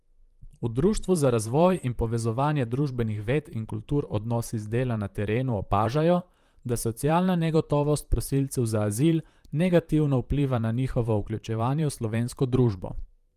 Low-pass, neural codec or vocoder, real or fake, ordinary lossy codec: 14.4 kHz; vocoder, 44.1 kHz, 128 mel bands, Pupu-Vocoder; fake; Opus, 32 kbps